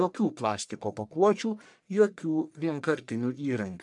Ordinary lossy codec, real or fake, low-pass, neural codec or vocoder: MP3, 96 kbps; fake; 10.8 kHz; codec, 44.1 kHz, 1.7 kbps, Pupu-Codec